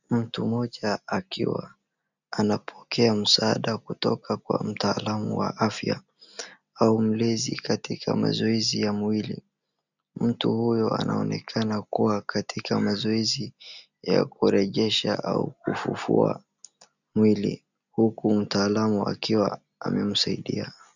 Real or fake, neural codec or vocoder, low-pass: real; none; 7.2 kHz